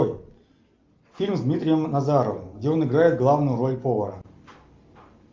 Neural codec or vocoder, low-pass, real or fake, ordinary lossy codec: none; 7.2 kHz; real; Opus, 24 kbps